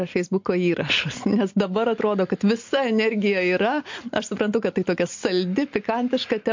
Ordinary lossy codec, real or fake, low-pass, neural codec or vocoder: MP3, 48 kbps; real; 7.2 kHz; none